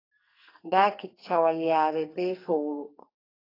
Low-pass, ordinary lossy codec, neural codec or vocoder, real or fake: 5.4 kHz; AAC, 24 kbps; codec, 44.1 kHz, 2.6 kbps, SNAC; fake